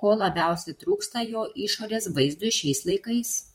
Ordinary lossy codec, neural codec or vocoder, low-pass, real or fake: MP3, 64 kbps; vocoder, 44.1 kHz, 128 mel bands, Pupu-Vocoder; 19.8 kHz; fake